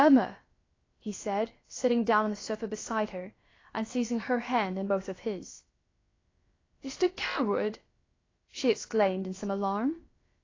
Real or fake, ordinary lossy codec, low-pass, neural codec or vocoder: fake; AAC, 32 kbps; 7.2 kHz; codec, 16 kHz, about 1 kbps, DyCAST, with the encoder's durations